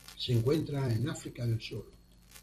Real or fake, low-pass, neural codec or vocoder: fake; 14.4 kHz; vocoder, 44.1 kHz, 128 mel bands every 256 samples, BigVGAN v2